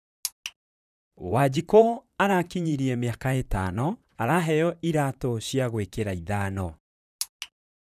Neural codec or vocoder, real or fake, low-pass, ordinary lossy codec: vocoder, 44.1 kHz, 128 mel bands, Pupu-Vocoder; fake; 14.4 kHz; none